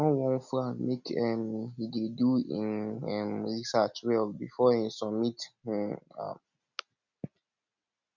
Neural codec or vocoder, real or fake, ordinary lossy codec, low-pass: none; real; none; 7.2 kHz